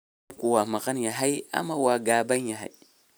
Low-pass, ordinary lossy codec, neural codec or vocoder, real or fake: none; none; none; real